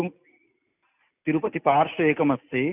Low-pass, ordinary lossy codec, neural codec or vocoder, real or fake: 3.6 kHz; none; none; real